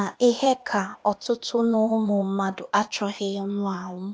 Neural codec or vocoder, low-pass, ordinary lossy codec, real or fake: codec, 16 kHz, 0.8 kbps, ZipCodec; none; none; fake